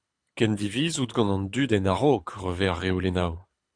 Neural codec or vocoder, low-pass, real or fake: codec, 24 kHz, 6 kbps, HILCodec; 9.9 kHz; fake